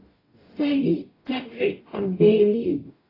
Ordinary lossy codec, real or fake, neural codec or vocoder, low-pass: AAC, 24 kbps; fake; codec, 44.1 kHz, 0.9 kbps, DAC; 5.4 kHz